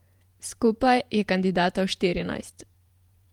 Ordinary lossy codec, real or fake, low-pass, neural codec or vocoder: Opus, 32 kbps; fake; 19.8 kHz; vocoder, 48 kHz, 128 mel bands, Vocos